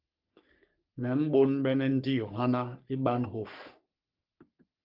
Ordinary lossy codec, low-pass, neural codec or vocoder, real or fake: Opus, 24 kbps; 5.4 kHz; codec, 44.1 kHz, 3.4 kbps, Pupu-Codec; fake